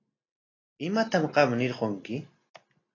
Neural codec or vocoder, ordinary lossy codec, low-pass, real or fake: none; AAC, 32 kbps; 7.2 kHz; real